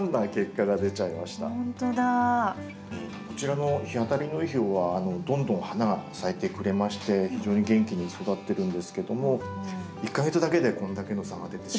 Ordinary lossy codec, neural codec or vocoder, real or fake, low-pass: none; none; real; none